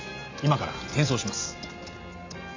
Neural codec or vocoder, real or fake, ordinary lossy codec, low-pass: none; real; AAC, 48 kbps; 7.2 kHz